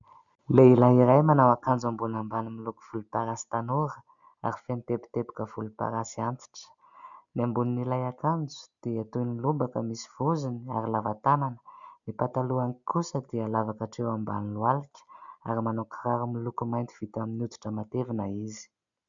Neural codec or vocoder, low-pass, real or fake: none; 7.2 kHz; real